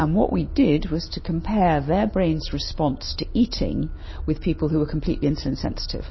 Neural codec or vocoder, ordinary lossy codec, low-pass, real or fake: none; MP3, 24 kbps; 7.2 kHz; real